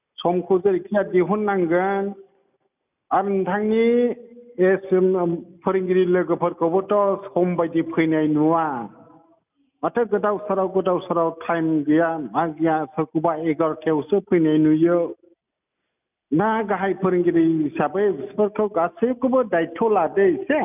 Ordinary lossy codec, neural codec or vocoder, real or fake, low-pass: none; none; real; 3.6 kHz